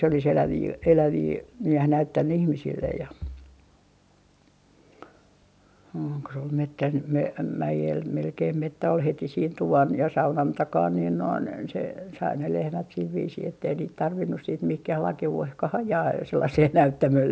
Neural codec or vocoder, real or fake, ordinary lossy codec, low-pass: none; real; none; none